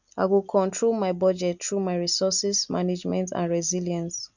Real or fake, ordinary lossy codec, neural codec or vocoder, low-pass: real; none; none; 7.2 kHz